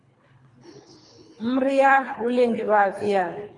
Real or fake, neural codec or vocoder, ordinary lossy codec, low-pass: fake; codec, 24 kHz, 3 kbps, HILCodec; AAC, 32 kbps; 10.8 kHz